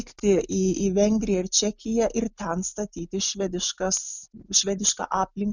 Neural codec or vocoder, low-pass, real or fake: none; 7.2 kHz; real